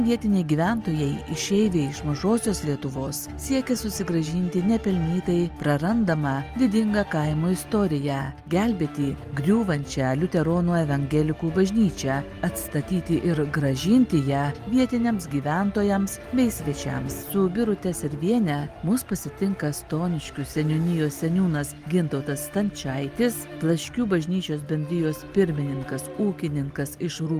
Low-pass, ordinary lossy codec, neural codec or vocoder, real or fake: 14.4 kHz; Opus, 32 kbps; none; real